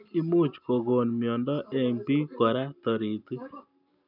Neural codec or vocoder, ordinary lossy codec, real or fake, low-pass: none; none; real; 5.4 kHz